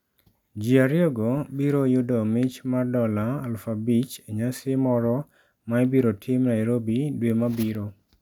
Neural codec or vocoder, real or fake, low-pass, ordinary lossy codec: none; real; 19.8 kHz; none